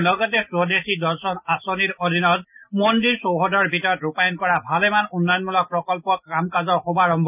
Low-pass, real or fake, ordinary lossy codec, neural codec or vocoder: 3.6 kHz; real; none; none